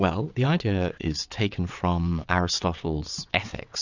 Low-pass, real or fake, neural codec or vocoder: 7.2 kHz; fake; vocoder, 22.05 kHz, 80 mel bands, WaveNeXt